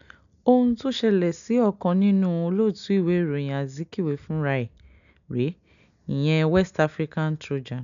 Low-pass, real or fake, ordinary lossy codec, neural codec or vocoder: 7.2 kHz; real; none; none